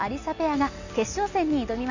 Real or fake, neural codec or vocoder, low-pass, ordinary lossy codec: real; none; 7.2 kHz; none